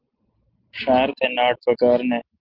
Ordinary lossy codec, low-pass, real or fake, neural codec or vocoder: Opus, 16 kbps; 5.4 kHz; real; none